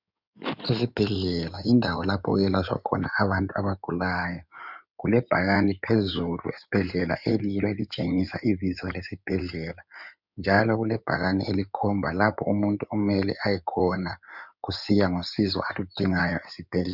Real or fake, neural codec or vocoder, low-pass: fake; codec, 16 kHz in and 24 kHz out, 2.2 kbps, FireRedTTS-2 codec; 5.4 kHz